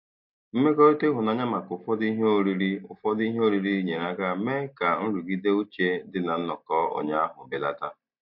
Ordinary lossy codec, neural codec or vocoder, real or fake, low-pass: MP3, 48 kbps; none; real; 5.4 kHz